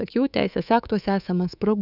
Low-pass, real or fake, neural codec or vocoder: 5.4 kHz; fake; codec, 24 kHz, 3.1 kbps, DualCodec